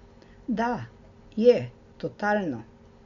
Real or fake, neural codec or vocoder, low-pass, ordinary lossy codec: real; none; 7.2 kHz; MP3, 48 kbps